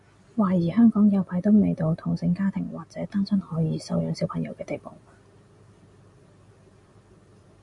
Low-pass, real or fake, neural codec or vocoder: 10.8 kHz; real; none